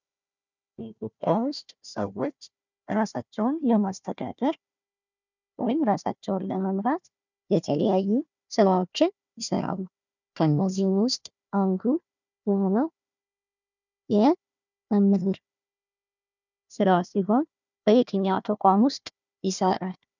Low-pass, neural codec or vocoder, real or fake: 7.2 kHz; codec, 16 kHz, 1 kbps, FunCodec, trained on Chinese and English, 50 frames a second; fake